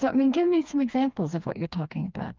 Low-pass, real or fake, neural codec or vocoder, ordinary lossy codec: 7.2 kHz; fake; codec, 16 kHz, 2 kbps, FreqCodec, smaller model; Opus, 24 kbps